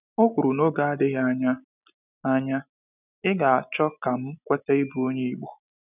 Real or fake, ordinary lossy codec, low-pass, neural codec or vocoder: real; none; 3.6 kHz; none